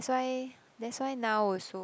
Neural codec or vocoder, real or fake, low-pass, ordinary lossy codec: none; real; none; none